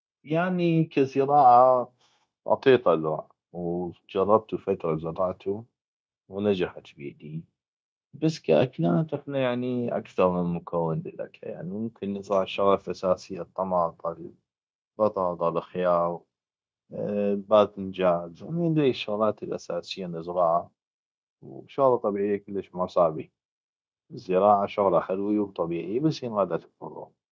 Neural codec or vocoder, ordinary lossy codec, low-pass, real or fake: codec, 16 kHz, 0.9 kbps, LongCat-Audio-Codec; none; none; fake